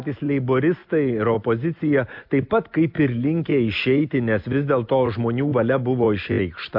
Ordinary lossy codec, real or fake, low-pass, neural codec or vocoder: MP3, 48 kbps; real; 5.4 kHz; none